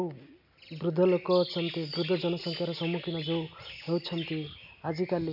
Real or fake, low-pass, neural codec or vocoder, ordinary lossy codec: real; 5.4 kHz; none; MP3, 48 kbps